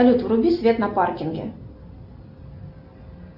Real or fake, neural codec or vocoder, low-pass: real; none; 5.4 kHz